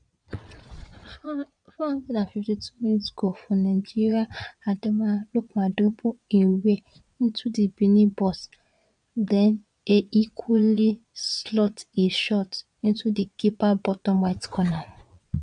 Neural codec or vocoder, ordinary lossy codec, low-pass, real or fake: vocoder, 22.05 kHz, 80 mel bands, Vocos; none; 9.9 kHz; fake